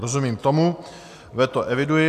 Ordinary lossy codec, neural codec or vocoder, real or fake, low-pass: AAC, 96 kbps; none; real; 14.4 kHz